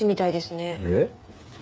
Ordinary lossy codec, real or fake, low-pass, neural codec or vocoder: none; fake; none; codec, 16 kHz, 8 kbps, FreqCodec, smaller model